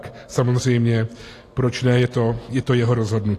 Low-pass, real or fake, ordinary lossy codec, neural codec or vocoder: 14.4 kHz; real; AAC, 48 kbps; none